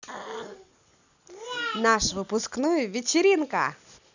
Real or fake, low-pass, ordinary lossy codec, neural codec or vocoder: real; 7.2 kHz; none; none